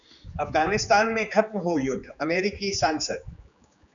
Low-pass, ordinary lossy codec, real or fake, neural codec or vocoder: 7.2 kHz; MP3, 96 kbps; fake; codec, 16 kHz, 4 kbps, X-Codec, HuBERT features, trained on general audio